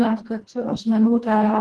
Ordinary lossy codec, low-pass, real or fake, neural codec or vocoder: Opus, 16 kbps; 10.8 kHz; fake; codec, 24 kHz, 1.5 kbps, HILCodec